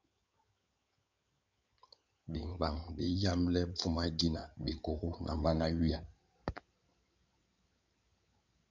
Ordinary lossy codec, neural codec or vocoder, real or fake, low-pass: MP3, 64 kbps; codec, 16 kHz in and 24 kHz out, 2.2 kbps, FireRedTTS-2 codec; fake; 7.2 kHz